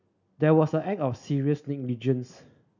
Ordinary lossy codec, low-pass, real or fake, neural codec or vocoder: none; 7.2 kHz; real; none